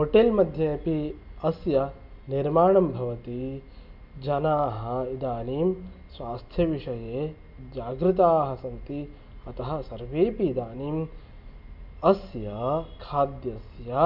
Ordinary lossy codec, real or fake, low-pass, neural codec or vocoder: none; real; 5.4 kHz; none